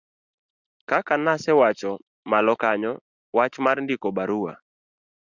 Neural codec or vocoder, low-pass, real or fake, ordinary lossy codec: none; 7.2 kHz; real; Opus, 64 kbps